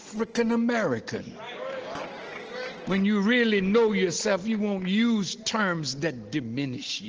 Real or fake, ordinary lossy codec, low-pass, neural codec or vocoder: real; Opus, 16 kbps; 7.2 kHz; none